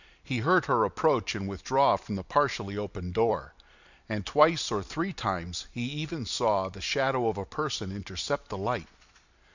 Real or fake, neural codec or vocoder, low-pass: fake; vocoder, 44.1 kHz, 128 mel bands every 256 samples, BigVGAN v2; 7.2 kHz